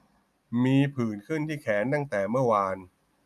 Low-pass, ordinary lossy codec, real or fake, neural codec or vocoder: 14.4 kHz; none; real; none